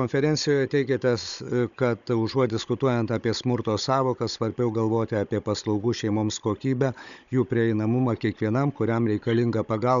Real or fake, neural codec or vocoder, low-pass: fake; codec, 16 kHz, 16 kbps, FunCodec, trained on Chinese and English, 50 frames a second; 7.2 kHz